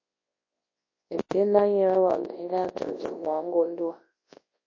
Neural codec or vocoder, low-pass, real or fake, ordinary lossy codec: codec, 24 kHz, 0.9 kbps, WavTokenizer, large speech release; 7.2 kHz; fake; MP3, 32 kbps